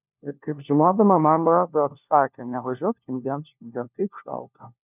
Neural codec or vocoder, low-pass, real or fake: codec, 16 kHz, 1 kbps, FunCodec, trained on LibriTTS, 50 frames a second; 3.6 kHz; fake